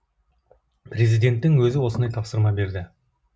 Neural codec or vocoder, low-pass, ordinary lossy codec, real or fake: none; none; none; real